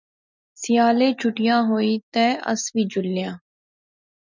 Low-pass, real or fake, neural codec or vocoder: 7.2 kHz; real; none